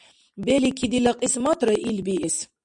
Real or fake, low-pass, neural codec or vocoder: real; 10.8 kHz; none